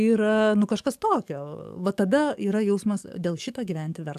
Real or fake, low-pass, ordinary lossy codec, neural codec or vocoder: fake; 14.4 kHz; AAC, 96 kbps; codec, 44.1 kHz, 7.8 kbps, DAC